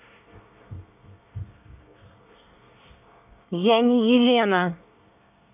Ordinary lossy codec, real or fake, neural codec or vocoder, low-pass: none; fake; codec, 24 kHz, 1 kbps, SNAC; 3.6 kHz